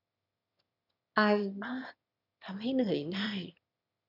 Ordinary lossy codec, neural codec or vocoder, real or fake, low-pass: none; autoencoder, 22.05 kHz, a latent of 192 numbers a frame, VITS, trained on one speaker; fake; 5.4 kHz